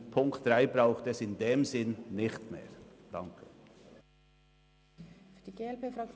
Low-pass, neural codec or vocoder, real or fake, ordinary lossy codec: none; none; real; none